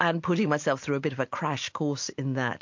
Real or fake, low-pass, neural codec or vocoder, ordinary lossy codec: real; 7.2 kHz; none; MP3, 48 kbps